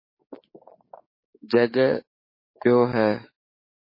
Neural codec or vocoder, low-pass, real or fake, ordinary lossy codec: codec, 44.1 kHz, 7.8 kbps, DAC; 5.4 kHz; fake; MP3, 24 kbps